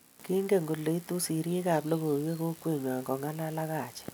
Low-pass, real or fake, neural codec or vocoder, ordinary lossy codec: none; real; none; none